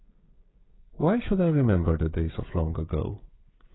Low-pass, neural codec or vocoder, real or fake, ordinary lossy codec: 7.2 kHz; codec, 16 kHz, 16 kbps, FreqCodec, smaller model; fake; AAC, 16 kbps